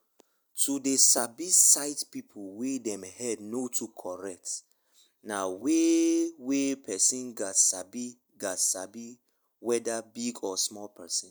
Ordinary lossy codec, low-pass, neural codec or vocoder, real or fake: none; none; none; real